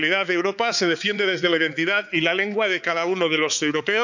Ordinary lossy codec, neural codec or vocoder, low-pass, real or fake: none; codec, 16 kHz, 4 kbps, X-Codec, HuBERT features, trained on balanced general audio; 7.2 kHz; fake